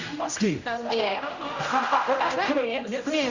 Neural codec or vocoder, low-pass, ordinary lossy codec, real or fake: codec, 16 kHz, 0.5 kbps, X-Codec, HuBERT features, trained on general audio; 7.2 kHz; Opus, 64 kbps; fake